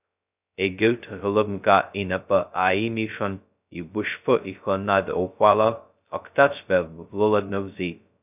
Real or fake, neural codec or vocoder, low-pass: fake; codec, 16 kHz, 0.2 kbps, FocalCodec; 3.6 kHz